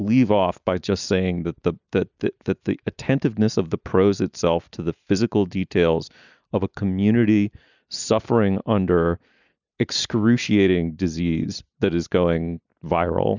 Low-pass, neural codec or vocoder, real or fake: 7.2 kHz; none; real